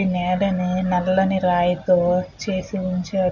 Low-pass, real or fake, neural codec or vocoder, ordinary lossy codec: 7.2 kHz; real; none; none